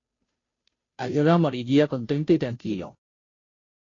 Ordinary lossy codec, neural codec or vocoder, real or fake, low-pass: AAC, 32 kbps; codec, 16 kHz, 0.5 kbps, FunCodec, trained on Chinese and English, 25 frames a second; fake; 7.2 kHz